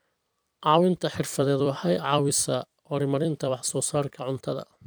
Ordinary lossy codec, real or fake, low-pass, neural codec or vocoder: none; fake; none; vocoder, 44.1 kHz, 128 mel bands every 256 samples, BigVGAN v2